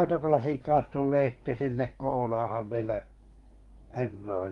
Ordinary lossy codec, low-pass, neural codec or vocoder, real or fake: Opus, 32 kbps; 10.8 kHz; codec, 24 kHz, 1 kbps, SNAC; fake